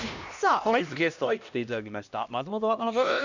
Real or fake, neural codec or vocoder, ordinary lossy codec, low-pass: fake; codec, 16 kHz, 1 kbps, X-Codec, HuBERT features, trained on LibriSpeech; none; 7.2 kHz